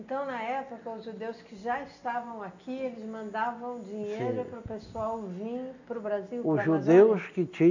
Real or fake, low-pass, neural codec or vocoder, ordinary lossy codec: real; 7.2 kHz; none; none